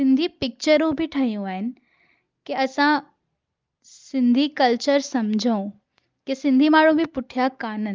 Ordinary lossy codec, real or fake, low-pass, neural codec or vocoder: Opus, 32 kbps; real; 7.2 kHz; none